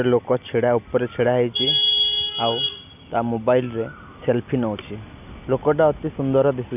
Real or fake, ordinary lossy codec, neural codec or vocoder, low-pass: real; none; none; 3.6 kHz